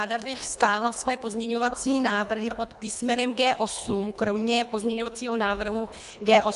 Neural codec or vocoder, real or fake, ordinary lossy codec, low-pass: codec, 24 kHz, 1.5 kbps, HILCodec; fake; AAC, 96 kbps; 10.8 kHz